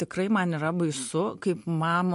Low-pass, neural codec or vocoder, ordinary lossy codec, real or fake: 14.4 kHz; none; MP3, 48 kbps; real